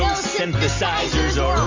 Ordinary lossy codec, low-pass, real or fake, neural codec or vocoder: MP3, 64 kbps; 7.2 kHz; real; none